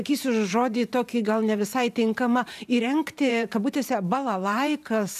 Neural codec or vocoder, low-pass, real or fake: vocoder, 44.1 kHz, 128 mel bands every 512 samples, BigVGAN v2; 14.4 kHz; fake